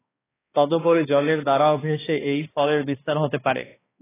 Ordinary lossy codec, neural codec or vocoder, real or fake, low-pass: AAC, 16 kbps; codec, 16 kHz, 2 kbps, X-Codec, HuBERT features, trained on balanced general audio; fake; 3.6 kHz